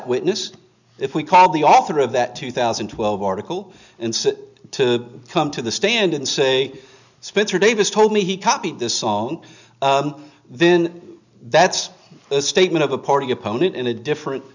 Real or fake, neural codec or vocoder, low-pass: real; none; 7.2 kHz